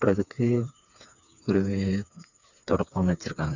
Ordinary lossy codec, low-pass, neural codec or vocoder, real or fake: none; 7.2 kHz; codec, 16 kHz, 4 kbps, FreqCodec, smaller model; fake